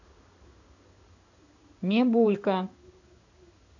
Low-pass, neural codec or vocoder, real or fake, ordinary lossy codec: 7.2 kHz; codec, 16 kHz in and 24 kHz out, 1 kbps, XY-Tokenizer; fake; none